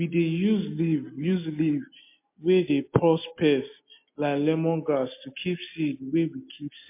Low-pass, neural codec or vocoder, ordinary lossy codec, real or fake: 3.6 kHz; none; MP3, 24 kbps; real